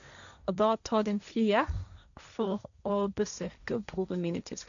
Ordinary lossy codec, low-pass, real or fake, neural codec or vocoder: none; 7.2 kHz; fake; codec, 16 kHz, 1.1 kbps, Voila-Tokenizer